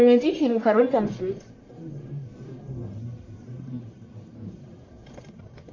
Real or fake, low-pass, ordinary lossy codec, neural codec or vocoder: fake; 7.2 kHz; AAC, 32 kbps; codec, 44.1 kHz, 1.7 kbps, Pupu-Codec